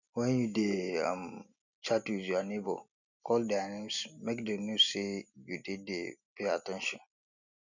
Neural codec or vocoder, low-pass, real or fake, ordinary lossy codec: none; 7.2 kHz; real; none